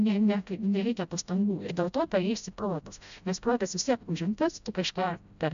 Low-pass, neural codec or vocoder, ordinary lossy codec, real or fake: 7.2 kHz; codec, 16 kHz, 0.5 kbps, FreqCodec, smaller model; AAC, 96 kbps; fake